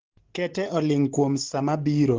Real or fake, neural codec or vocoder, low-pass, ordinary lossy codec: real; none; 7.2 kHz; Opus, 16 kbps